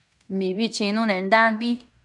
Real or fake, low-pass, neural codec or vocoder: fake; 10.8 kHz; codec, 16 kHz in and 24 kHz out, 0.9 kbps, LongCat-Audio-Codec, fine tuned four codebook decoder